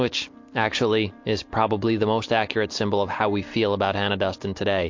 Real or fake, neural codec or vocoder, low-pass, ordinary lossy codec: real; none; 7.2 kHz; MP3, 48 kbps